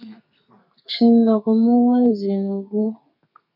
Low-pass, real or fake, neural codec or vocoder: 5.4 kHz; fake; codec, 32 kHz, 1.9 kbps, SNAC